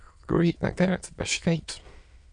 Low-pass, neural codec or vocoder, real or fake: 9.9 kHz; autoencoder, 22.05 kHz, a latent of 192 numbers a frame, VITS, trained on many speakers; fake